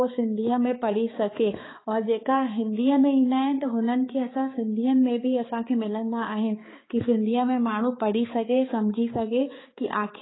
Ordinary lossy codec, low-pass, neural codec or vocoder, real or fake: AAC, 16 kbps; 7.2 kHz; codec, 16 kHz, 4 kbps, X-Codec, HuBERT features, trained on balanced general audio; fake